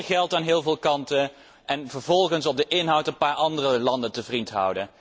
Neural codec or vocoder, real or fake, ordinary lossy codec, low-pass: none; real; none; none